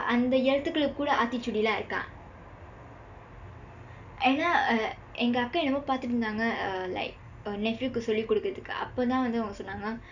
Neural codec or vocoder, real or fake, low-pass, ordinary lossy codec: none; real; 7.2 kHz; none